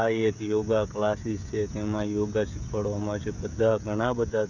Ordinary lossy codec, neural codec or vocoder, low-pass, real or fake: none; codec, 16 kHz, 16 kbps, FreqCodec, smaller model; 7.2 kHz; fake